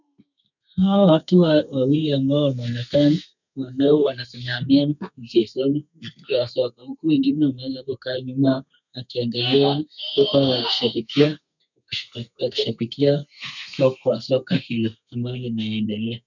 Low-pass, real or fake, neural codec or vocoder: 7.2 kHz; fake; codec, 32 kHz, 1.9 kbps, SNAC